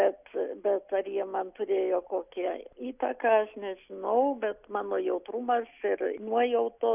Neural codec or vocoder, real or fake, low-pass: none; real; 3.6 kHz